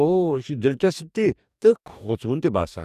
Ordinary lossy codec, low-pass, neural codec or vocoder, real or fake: none; 14.4 kHz; codec, 44.1 kHz, 2.6 kbps, DAC; fake